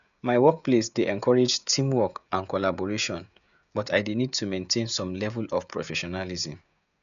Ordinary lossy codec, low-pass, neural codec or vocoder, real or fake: none; 7.2 kHz; codec, 16 kHz, 16 kbps, FreqCodec, smaller model; fake